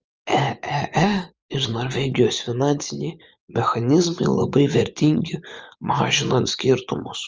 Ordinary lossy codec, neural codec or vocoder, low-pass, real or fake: Opus, 24 kbps; vocoder, 24 kHz, 100 mel bands, Vocos; 7.2 kHz; fake